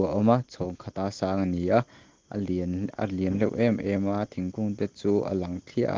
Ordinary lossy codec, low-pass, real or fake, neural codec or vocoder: Opus, 16 kbps; 7.2 kHz; real; none